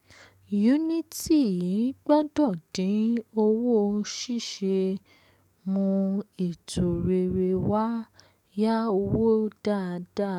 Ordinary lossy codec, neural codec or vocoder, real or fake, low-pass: none; codec, 44.1 kHz, 7.8 kbps, DAC; fake; 19.8 kHz